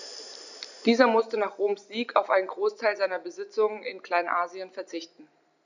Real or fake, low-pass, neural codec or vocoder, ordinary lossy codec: real; 7.2 kHz; none; none